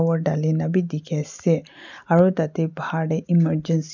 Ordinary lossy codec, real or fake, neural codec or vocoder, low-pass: none; real; none; 7.2 kHz